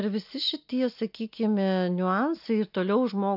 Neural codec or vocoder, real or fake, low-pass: none; real; 5.4 kHz